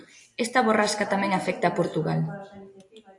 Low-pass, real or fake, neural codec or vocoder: 10.8 kHz; real; none